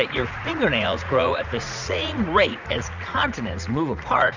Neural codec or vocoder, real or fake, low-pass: vocoder, 44.1 kHz, 80 mel bands, Vocos; fake; 7.2 kHz